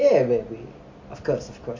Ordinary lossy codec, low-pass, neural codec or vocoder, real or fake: MP3, 48 kbps; 7.2 kHz; none; real